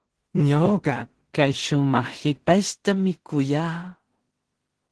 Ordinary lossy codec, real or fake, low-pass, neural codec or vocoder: Opus, 16 kbps; fake; 10.8 kHz; codec, 16 kHz in and 24 kHz out, 0.4 kbps, LongCat-Audio-Codec, two codebook decoder